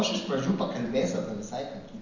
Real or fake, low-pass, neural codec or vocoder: real; 7.2 kHz; none